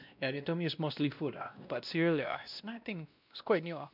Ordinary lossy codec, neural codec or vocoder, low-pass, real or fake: none; codec, 16 kHz, 1 kbps, X-Codec, WavLM features, trained on Multilingual LibriSpeech; 5.4 kHz; fake